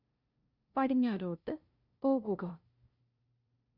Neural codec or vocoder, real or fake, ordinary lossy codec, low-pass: codec, 16 kHz, 0.5 kbps, FunCodec, trained on LibriTTS, 25 frames a second; fake; Opus, 64 kbps; 5.4 kHz